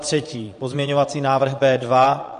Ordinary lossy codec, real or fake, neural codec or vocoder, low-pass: MP3, 48 kbps; fake; vocoder, 22.05 kHz, 80 mel bands, Vocos; 9.9 kHz